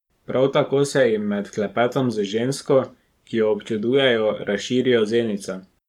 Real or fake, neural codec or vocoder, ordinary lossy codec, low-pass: fake; codec, 44.1 kHz, 7.8 kbps, Pupu-Codec; none; 19.8 kHz